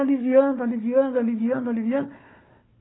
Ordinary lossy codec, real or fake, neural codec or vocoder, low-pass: AAC, 16 kbps; fake; codec, 16 kHz, 4 kbps, FunCodec, trained on Chinese and English, 50 frames a second; 7.2 kHz